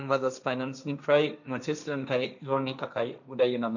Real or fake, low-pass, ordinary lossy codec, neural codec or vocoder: fake; 7.2 kHz; none; codec, 16 kHz, 1.1 kbps, Voila-Tokenizer